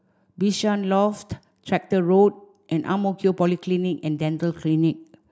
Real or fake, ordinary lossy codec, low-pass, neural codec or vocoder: real; none; none; none